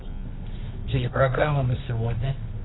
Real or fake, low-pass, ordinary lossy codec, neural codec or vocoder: fake; 7.2 kHz; AAC, 16 kbps; codec, 24 kHz, 0.9 kbps, WavTokenizer, medium music audio release